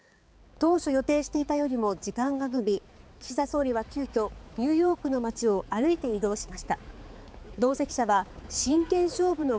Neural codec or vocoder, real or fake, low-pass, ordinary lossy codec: codec, 16 kHz, 4 kbps, X-Codec, HuBERT features, trained on balanced general audio; fake; none; none